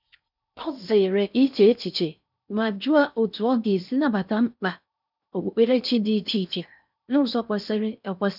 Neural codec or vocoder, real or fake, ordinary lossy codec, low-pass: codec, 16 kHz in and 24 kHz out, 0.6 kbps, FocalCodec, streaming, 4096 codes; fake; none; 5.4 kHz